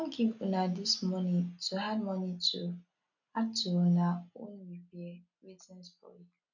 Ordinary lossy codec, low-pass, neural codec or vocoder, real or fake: none; 7.2 kHz; none; real